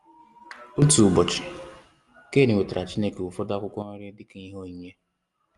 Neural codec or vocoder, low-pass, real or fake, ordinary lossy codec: none; 10.8 kHz; real; Opus, 32 kbps